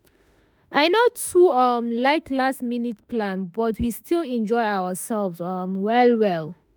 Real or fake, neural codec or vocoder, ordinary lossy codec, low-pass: fake; autoencoder, 48 kHz, 32 numbers a frame, DAC-VAE, trained on Japanese speech; none; none